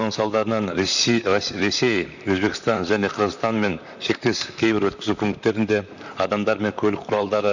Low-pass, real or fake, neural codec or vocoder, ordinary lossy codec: 7.2 kHz; fake; vocoder, 44.1 kHz, 128 mel bands, Pupu-Vocoder; none